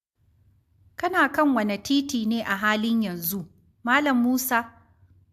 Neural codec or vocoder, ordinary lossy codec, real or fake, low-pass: none; AAC, 96 kbps; real; 14.4 kHz